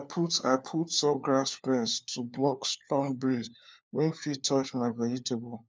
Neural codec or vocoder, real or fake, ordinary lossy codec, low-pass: codec, 16 kHz, 4 kbps, FunCodec, trained on LibriTTS, 50 frames a second; fake; none; none